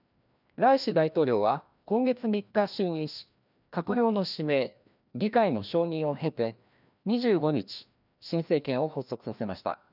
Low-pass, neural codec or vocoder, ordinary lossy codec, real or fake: 5.4 kHz; codec, 16 kHz, 1 kbps, FreqCodec, larger model; none; fake